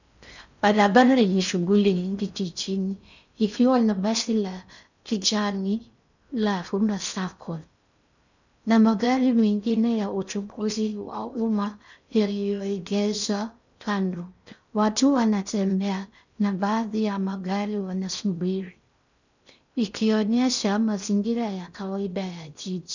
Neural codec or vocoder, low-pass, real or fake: codec, 16 kHz in and 24 kHz out, 0.6 kbps, FocalCodec, streaming, 4096 codes; 7.2 kHz; fake